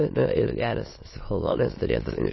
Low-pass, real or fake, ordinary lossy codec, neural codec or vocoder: 7.2 kHz; fake; MP3, 24 kbps; autoencoder, 22.05 kHz, a latent of 192 numbers a frame, VITS, trained on many speakers